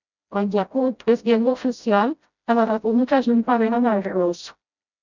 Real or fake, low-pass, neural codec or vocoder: fake; 7.2 kHz; codec, 16 kHz, 0.5 kbps, FreqCodec, smaller model